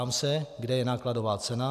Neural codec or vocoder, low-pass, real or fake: none; 14.4 kHz; real